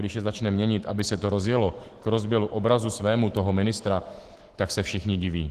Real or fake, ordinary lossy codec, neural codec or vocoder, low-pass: fake; Opus, 16 kbps; autoencoder, 48 kHz, 128 numbers a frame, DAC-VAE, trained on Japanese speech; 14.4 kHz